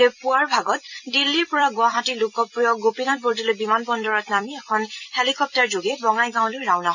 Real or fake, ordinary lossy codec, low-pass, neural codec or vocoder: fake; none; 7.2 kHz; vocoder, 44.1 kHz, 128 mel bands every 256 samples, BigVGAN v2